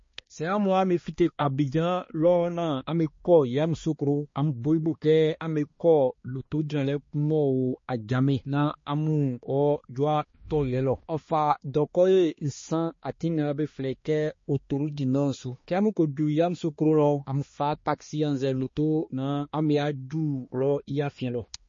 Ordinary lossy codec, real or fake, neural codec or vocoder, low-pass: MP3, 32 kbps; fake; codec, 16 kHz, 2 kbps, X-Codec, HuBERT features, trained on balanced general audio; 7.2 kHz